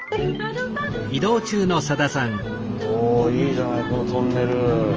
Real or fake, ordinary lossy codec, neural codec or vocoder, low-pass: real; Opus, 24 kbps; none; 7.2 kHz